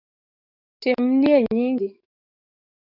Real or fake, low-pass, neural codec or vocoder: fake; 5.4 kHz; codec, 44.1 kHz, 7.8 kbps, DAC